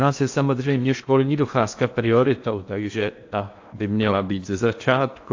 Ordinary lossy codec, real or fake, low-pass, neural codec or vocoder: AAC, 48 kbps; fake; 7.2 kHz; codec, 16 kHz in and 24 kHz out, 0.8 kbps, FocalCodec, streaming, 65536 codes